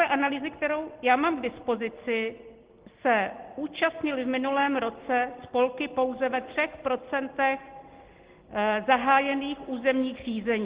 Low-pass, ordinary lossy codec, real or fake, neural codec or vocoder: 3.6 kHz; Opus, 16 kbps; real; none